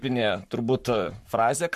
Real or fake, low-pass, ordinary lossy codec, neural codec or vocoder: fake; 14.4 kHz; MP3, 64 kbps; codec, 44.1 kHz, 7.8 kbps, Pupu-Codec